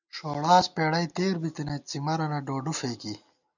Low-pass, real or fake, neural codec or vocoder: 7.2 kHz; real; none